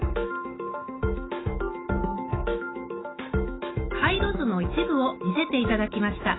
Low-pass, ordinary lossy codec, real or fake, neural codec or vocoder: 7.2 kHz; AAC, 16 kbps; fake; autoencoder, 48 kHz, 128 numbers a frame, DAC-VAE, trained on Japanese speech